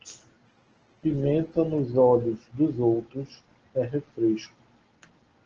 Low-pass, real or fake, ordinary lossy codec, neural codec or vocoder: 7.2 kHz; real; Opus, 16 kbps; none